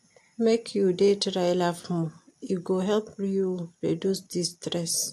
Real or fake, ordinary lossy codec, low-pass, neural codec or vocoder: real; AAC, 64 kbps; 14.4 kHz; none